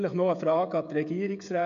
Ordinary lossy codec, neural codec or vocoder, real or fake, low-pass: none; codec, 16 kHz, 16 kbps, FreqCodec, smaller model; fake; 7.2 kHz